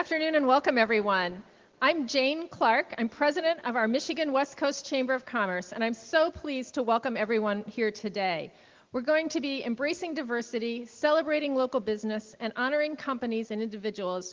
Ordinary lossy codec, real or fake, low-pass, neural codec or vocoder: Opus, 16 kbps; real; 7.2 kHz; none